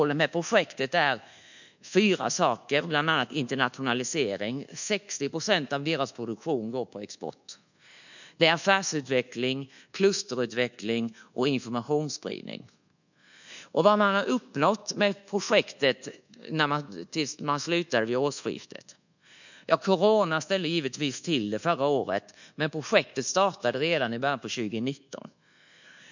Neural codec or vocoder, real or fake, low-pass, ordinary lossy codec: codec, 24 kHz, 1.2 kbps, DualCodec; fake; 7.2 kHz; none